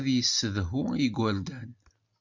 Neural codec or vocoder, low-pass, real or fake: none; 7.2 kHz; real